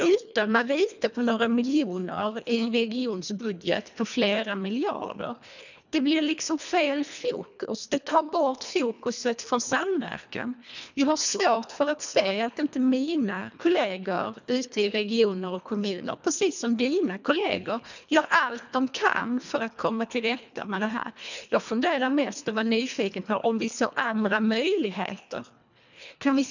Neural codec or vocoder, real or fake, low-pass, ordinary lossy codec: codec, 24 kHz, 1.5 kbps, HILCodec; fake; 7.2 kHz; none